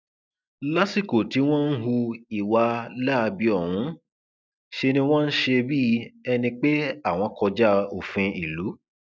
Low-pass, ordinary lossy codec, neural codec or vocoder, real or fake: none; none; none; real